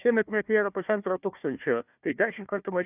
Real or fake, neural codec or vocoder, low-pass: fake; codec, 16 kHz, 1 kbps, FunCodec, trained on Chinese and English, 50 frames a second; 3.6 kHz